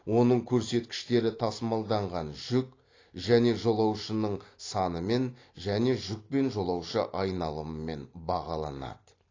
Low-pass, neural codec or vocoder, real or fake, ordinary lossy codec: 7.2 kHz; none; real; AAC, 32 kbps